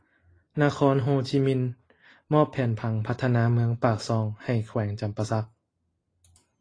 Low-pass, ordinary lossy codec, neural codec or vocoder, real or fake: 9.9 kHz; AAC, 32 kbps; none; real